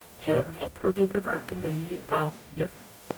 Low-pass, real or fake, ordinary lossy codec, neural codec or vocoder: none; fake; none; codec, 44.1 kHz, 0.9 kbps, DAC